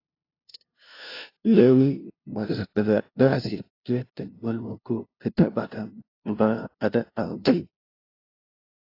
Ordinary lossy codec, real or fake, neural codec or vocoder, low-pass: AAC, 32 kbps; fake; codec, 16 kHz, 0.5 kbps, FunCodec, trained on LibriTTS, 25 frames a second; 5.4 kHz